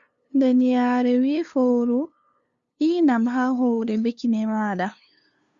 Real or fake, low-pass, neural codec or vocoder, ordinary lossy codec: fake; 7.2 kHz; codec, 16 kHz, 2 kbps, FunCodec, trained on LibriTTS, 25 frames a second; Opus, 64 kbps